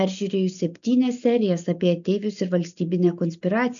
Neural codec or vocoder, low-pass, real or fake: none; 7.2 kHz; real